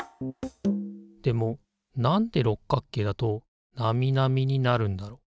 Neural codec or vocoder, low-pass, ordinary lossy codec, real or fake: none; none; none; real